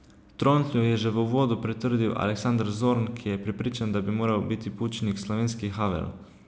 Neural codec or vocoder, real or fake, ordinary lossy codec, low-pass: none; real; none; none